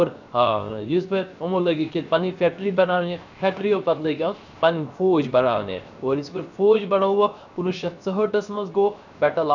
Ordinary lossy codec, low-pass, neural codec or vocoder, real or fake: none; 7.2 kHz; codec, 16 kHz, 0.7 kbps, FocalCodec; fake